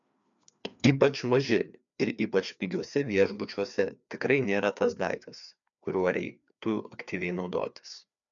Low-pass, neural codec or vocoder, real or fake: 7.2 kHz; codec, 16 kHz, 2 kbps, FreqCodec, larger model; fake